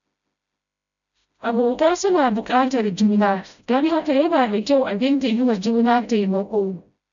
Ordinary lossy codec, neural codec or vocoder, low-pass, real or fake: none; codec, 16 kHz, 0.5 kbps, FreqCodec, smaller model; 7.2 kHz; fake